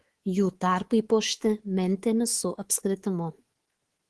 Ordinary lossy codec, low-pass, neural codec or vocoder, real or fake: Opus, 16 kbps; 10.8 kHz; codec, 24 kHz, 3.1 kbps, DualCodec; fake